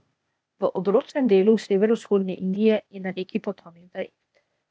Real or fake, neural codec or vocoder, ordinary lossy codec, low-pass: fake; codec, 16 kHz, 0.8 kbps, ZipCodec; none; none